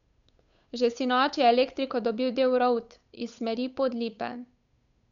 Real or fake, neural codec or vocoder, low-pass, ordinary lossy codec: fake; codec, 16 kHz, 8 kbps, FunCodec, trained on Chinese and English, 25 frames a second; 7.2 kHz; none